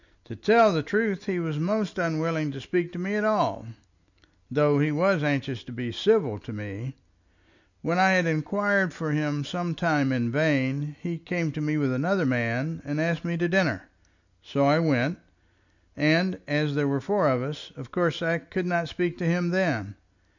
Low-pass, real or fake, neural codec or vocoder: 7.2 kHz; real; none